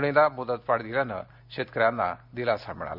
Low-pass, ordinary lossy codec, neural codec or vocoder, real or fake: 5.4 kHz; none; none; real